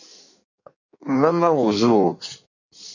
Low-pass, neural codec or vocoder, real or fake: 7.2 kHz; codec, 16 kHz in and 24 kHz out, 1.1 kbps, FireRedTTS-2 codec; fake